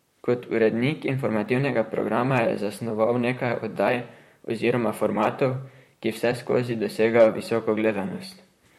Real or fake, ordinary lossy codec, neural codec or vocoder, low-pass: fake; MP3, 64 kbps; vocoder, 44.1 kHz, 128 mel bands, Pupu-Vocoder; 19.8 kHz